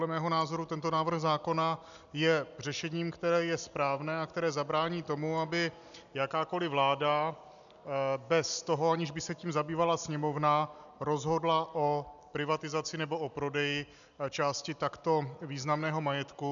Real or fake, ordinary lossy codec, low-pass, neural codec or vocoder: real; MP3, 96 kbps; 7.2 kHz; none